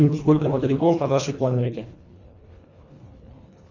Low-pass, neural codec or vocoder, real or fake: 7.2 kHz; codec, 24 kHz, 1.5 kbps, HILCodec; fake